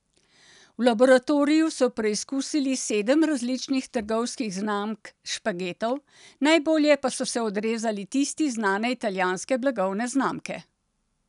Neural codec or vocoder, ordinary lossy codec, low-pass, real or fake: none; none; 10.8 kHz; real